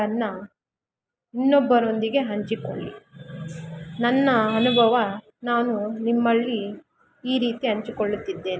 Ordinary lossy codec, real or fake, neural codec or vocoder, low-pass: none; real; none; none